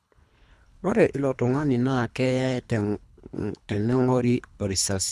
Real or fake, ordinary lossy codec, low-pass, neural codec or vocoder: fake; none; none; codec, 24 kHz, 3 kbps, HILCodec